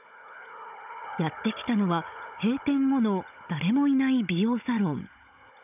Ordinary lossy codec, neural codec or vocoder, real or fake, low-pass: none; codec, 16 kHz, 16 kbps, FunCodec, trained on Chinese and English, 50 frames a second; fake; 3.6 kHz